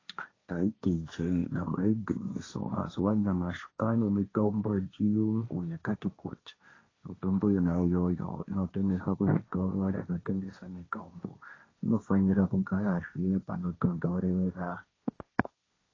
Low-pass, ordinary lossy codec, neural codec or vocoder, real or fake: 7.2 kHz; AAC, 32 kbps; codec, 16 kHz, 1.1 kbps, Voila-Tokenizer; fake